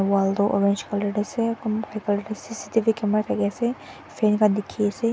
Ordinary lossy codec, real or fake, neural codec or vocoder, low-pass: none; real; none; none